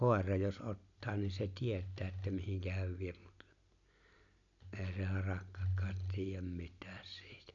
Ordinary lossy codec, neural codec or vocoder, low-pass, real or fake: none; none; 7.2 kHz; real